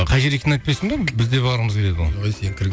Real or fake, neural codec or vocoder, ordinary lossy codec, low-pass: real; none; none; none